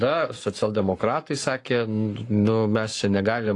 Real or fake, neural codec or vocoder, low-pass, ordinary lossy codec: fake; codec, 44.1 kHz, 7.8 kbps, Pupu-Codec; 10.8 kHz; AAC, 48 kbps